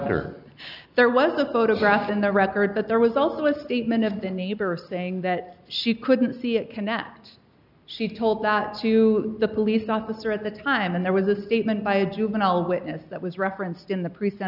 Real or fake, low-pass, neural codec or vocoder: real; 5.4 kHz; none